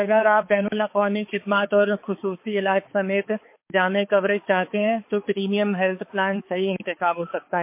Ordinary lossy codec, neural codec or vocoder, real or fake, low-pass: MP3, 24 kbps; codec, 16 kHz, 4 kbps, X-Codec, HuBERT features, trained on balanced general audio; fake; 3.6 kHz